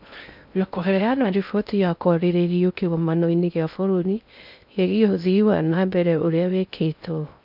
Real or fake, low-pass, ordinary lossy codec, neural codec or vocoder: fake; 5.4 kHz; none; codec, 16 kHz in and 24 kHz out, 0.6 kbps, FocalCodec, streaming, 2048 codes